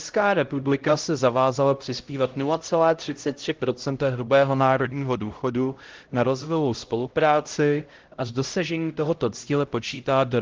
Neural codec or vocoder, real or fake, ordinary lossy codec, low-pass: codec, 16 kHz, 0.5 kbps, X-Codec, HuBERT features, trained on LibriSpeech; fake; Opus, 16 kbps; 7.2 kHz